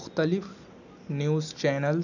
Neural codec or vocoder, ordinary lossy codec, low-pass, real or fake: none; none; 7.2 kHz; real